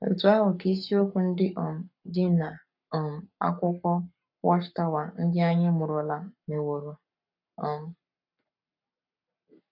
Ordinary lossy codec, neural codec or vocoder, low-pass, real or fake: none; codec, 44.1 kHz, 7.8 kbps, Pupu-Codec; 5.4 kHz; fake